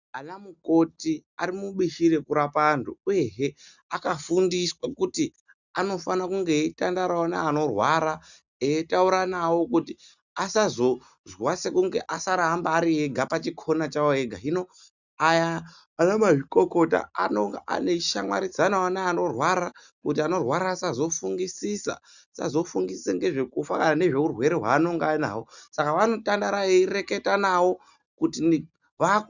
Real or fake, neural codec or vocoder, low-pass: real; none; 7.2 kHz